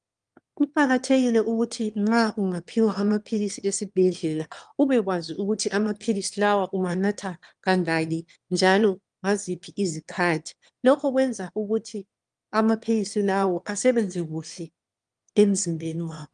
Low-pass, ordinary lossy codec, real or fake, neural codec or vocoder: 9.9 kHz; Opus, 32 kbps; fake; autoencoder, 22.05 kHz, a latent of 192 numbers a frame, VITS, trained on one speaker